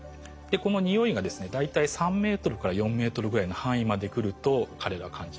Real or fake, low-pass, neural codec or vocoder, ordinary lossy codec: real; none; none; none